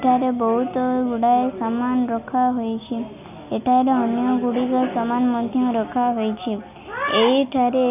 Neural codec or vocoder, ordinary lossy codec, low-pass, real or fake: none; none; 3.6 kHz; real